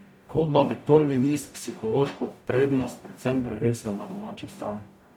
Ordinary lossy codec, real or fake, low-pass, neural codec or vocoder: none; fake; 19.8 kHz; codec, 44.1 kHz, 0.9 kbps, DAC